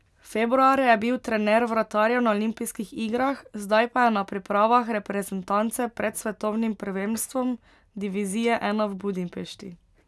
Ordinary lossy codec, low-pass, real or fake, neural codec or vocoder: none; none; real; none